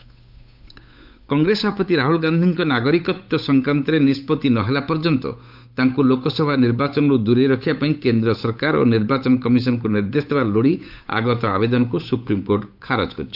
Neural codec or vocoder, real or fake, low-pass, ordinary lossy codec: codec, 16 kHz, 8 kbps, FunCodec, trained on Chinese and English, 25 frames a second; fake; 5.4 kHz; none